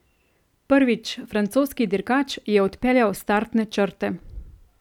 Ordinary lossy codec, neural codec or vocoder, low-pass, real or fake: none; none; 19.8 kHz; real